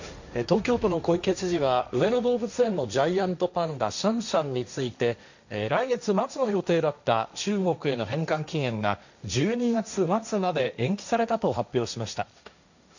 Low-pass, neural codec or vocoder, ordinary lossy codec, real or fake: 7.2 kHz; codec, 16 kHz, 1.1 kbps, Voila-Tokenizer; none; fake